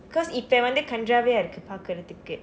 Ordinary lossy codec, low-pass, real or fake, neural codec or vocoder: none; none; real; none